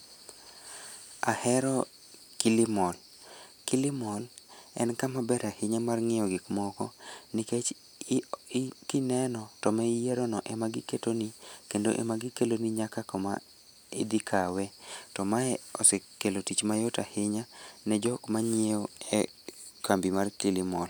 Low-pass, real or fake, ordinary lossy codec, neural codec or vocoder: none; real; none; none